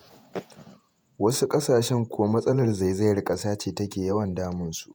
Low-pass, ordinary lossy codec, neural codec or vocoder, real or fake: none; none; vocoder, 48 kHz, 128 mel bands, Vocos; fake